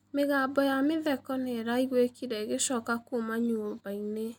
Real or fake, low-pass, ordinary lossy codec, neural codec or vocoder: real; 19.8 kHz; none; none